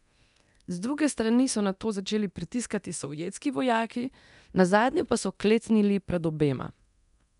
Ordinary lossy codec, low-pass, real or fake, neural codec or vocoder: none; 10.8 kHz; fake; codec, 24 kHz, 0.9 kbps, DualCodec